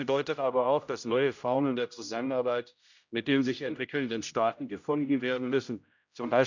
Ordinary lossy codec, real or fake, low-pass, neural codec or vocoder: none; fake; 7.2 kHz; codec, 16 kHz, 0.5 kbps, X-Codec, HuBERT features, trained on general audio